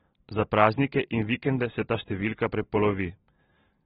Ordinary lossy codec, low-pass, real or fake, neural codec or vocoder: AAC, 16 kbps; 19.8 kHz; real; none